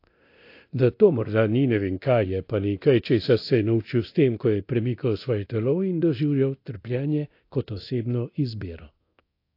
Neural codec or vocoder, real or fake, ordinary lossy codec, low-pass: codec, 24 kHz, 0.9 kbps, DualCodec; fake; AAC, 32 kbps; 5.4 kHz